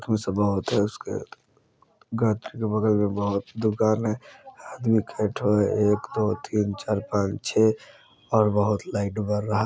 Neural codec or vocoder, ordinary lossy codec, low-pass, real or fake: none; none; none; real